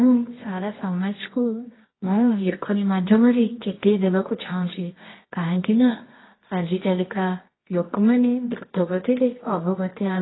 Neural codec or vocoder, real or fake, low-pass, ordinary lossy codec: codec, 24 kHz, 0.9 kbps, WavTokenizer, medium music audio release; fake; 7.2 kHz; AAC, 16 kbps